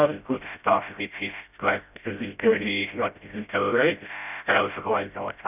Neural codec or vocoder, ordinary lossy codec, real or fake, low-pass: codec, 16 kHz, 0.5 kbps, FreqCodec, smaller model; none; fake; 3.6 kHz